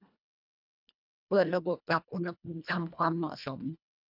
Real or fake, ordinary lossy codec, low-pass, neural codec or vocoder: fake; none; 5.4 kHz; codec, 24 kHz, 1.5 kbps, HILCodec